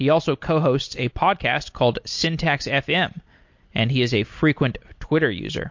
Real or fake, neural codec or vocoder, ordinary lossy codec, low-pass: real; none; MP3, 48 kbps; 7.2 kHz